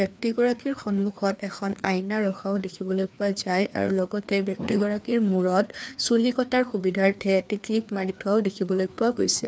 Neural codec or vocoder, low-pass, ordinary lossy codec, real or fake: codec, 16 kHz, 2 kbps, FreqCodec, larger model; none; none; fake